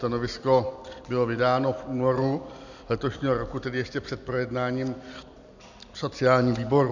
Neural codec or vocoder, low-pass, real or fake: none; 7.2 kHz; real